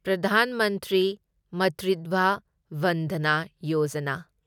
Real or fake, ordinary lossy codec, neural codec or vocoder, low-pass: real; none; none; 19.8 kHz